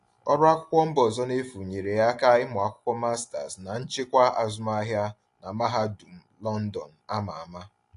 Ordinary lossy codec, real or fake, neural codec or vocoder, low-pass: AAC, 48 kbps; real; none; 10.8 kHz